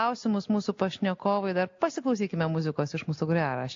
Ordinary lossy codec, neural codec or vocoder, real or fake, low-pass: AAC, 48 kbps; none; real; 7.2 kHz